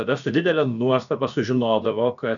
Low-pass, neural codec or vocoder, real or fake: 7.2 kHz; codec, 16 kHz, about 1 kbps, DyCAST, with the encoder's durations; fake